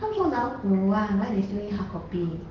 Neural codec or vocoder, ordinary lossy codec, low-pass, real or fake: none; Opus, 16 kbps; 7.2 kHz; real